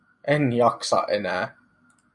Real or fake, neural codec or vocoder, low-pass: real; none; 10.8 kHz